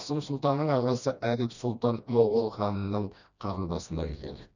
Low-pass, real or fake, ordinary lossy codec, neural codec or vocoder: 7.2 kHz; fake; none; codec, 16 kHz, 1 kbps, FreqCodec, smaller model